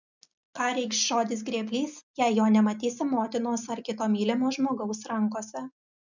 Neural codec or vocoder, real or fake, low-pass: none; real; 7.2 kHz